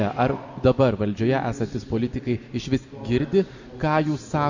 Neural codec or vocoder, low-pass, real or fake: none; 7.2 kHz; real